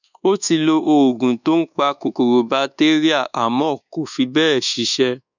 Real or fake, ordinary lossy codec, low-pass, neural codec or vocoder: fake; none; 7.2 kHz; codec, 24 kHz, 1.2 kbps, DualCodec